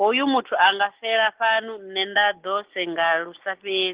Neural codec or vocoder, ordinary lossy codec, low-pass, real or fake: none; Opus, 16 kbps; 3.6 kHz; real